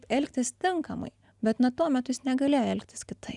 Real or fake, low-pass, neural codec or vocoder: real; 10.8 kHz; none